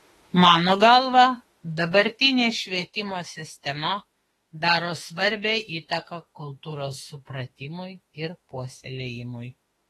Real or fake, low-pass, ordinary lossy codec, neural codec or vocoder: fake; 19.8 kHz; AAC, 32 kbps; autoencoder, 48 kHz, 32 numbers a frame, DAC-VAE, trained on Japanese speech